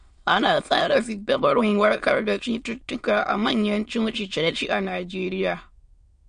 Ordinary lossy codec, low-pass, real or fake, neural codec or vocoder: MP3, 48 kbps; 9.9 kHz; fake; autoencoder, 22.05 kHz, a latent of 192 numbers a frame, VITS, trained on many speakers